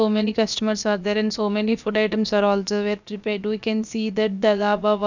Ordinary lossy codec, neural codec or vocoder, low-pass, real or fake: none; codec, 16 kHz, about 1 kbps, DyCAST, with the encoder's durations; 7.2 kHz; fake